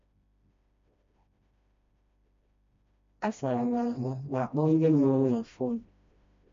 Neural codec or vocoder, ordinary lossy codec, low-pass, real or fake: codec, 16 kHz, 1 kbps, FreqCodec, smaller model; MP3, 48 kbps; 7.2 kHz; fake